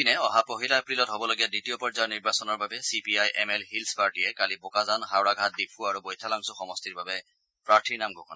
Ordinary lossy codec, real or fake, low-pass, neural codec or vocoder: none; real; none; none